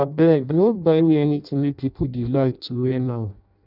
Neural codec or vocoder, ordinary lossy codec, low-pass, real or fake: codec, 16 kHz in and 24 kHz out, 0.6 kbps, FireRedTTS-2 codec; none; 5.4 kHz; fake